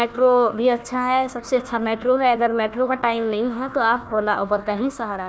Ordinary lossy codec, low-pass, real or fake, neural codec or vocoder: none; none; fake; codec, 16 kHz, 1 kbps, FunCodec, trained on Chinese and English, 50 frames a second